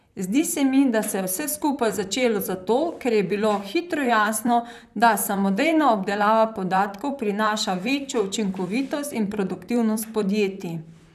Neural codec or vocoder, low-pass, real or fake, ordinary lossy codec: vocoder, 44.1 kHz, 128 mel bands, Pupu-Vocoder; 14.4 kHz; fake; none